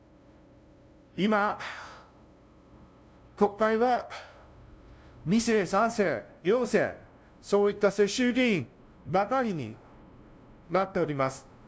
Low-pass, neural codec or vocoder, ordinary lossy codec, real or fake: none; codec, 16 kHz, 0.5 kbps, FunCodec, trained on LibriTTS, 25 frames a second; none; fake